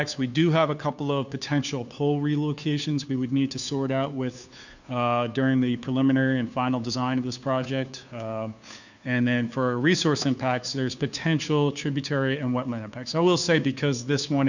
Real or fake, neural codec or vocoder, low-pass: fake; codec, 16 kHz, 2 kbps, FunCodec, trained on Chinese and English, 25 frames a second; 7.2 kHz